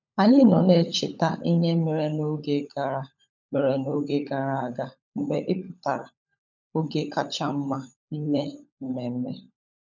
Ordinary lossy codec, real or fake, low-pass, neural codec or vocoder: none; fake; 7.2 kHz; codec, 16 kHz, 16 kbps, FunCodec, trained on LibriTTS, 50 frames a second